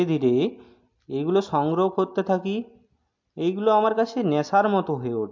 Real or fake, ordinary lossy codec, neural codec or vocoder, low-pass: real; MP3, 48 kbps; none; 7.2 kHz